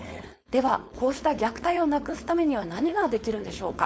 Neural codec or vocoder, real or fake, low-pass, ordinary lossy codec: codec, 16 kHz, 4.8 kbps, FACodec; fake; none; none